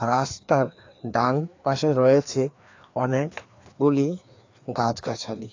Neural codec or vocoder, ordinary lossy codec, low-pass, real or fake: codec, 16 kHz, 2 kbps, FreqCodec, larger model; AAC, 48 kbps; 7.2 kHz; fake